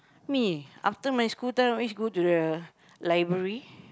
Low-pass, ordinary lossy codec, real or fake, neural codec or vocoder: none; none; real; none